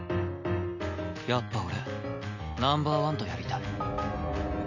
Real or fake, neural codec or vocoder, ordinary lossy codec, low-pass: real; none; none; 7.2 kHz